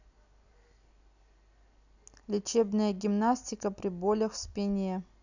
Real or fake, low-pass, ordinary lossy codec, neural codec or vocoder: real; 7.2 kHz; none; none